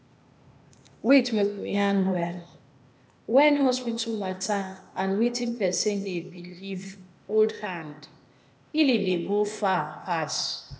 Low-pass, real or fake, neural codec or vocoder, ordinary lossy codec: none; fake; codec, 16 kHz, 0.8 kbps, ZipCodec; none